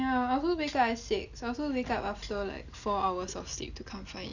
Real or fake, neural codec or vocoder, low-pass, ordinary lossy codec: real; none; 7.2 kHz; none